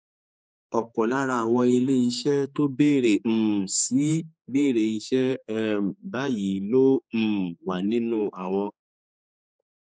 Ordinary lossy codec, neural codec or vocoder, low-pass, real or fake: none; codec, 16 kHz, 4 kbps, X-Codec, HuBERT features, trained on general audio; none; fake